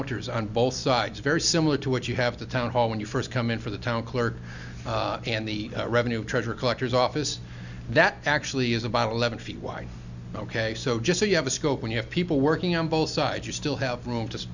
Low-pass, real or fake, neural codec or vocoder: 7.2 kHz; real; none